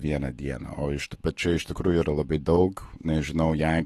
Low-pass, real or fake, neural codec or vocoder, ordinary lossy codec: 19.8 kHz; fake; autoencoder, 48 kHz, 128 numbers a frame, DAC-VAE, trained on Japanese speech; AAC, 32 kbps